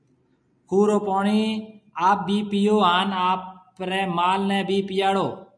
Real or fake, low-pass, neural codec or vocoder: real; 9.9 kHz; none